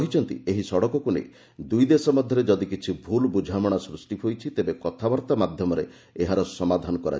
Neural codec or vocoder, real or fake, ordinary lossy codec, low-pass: none; real; none; none